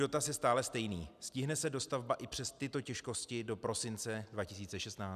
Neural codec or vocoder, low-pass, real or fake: none; 14.4 kHz; real